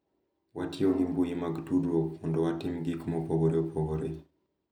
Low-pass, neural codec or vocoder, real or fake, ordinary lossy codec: 19.8 kHz; none; real; none